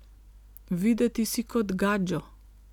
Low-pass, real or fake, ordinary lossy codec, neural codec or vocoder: 19.8 kHz; real; none; none